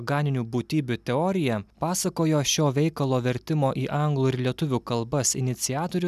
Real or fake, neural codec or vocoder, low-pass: real; none; 14.4 kHz